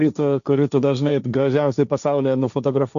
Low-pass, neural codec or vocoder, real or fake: 7.2 kHz; codec, 16 kHz, 1.1 kbps, Voila-Tokenizer; fake